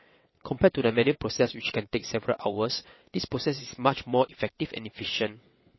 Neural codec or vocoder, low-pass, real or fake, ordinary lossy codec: vocoder, 22.05 kHz, 80 mel bands, WaveNeXt; 7.2 kHz; fake; MP3, 24 kbps